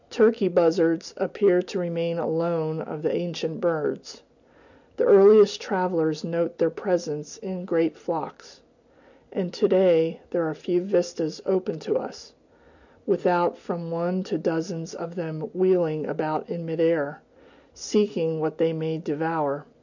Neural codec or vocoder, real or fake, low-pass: none; real; 7.2 kHz